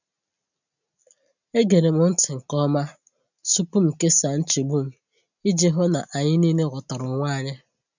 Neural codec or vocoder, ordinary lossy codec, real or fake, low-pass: none; none; real; 7.2 kHz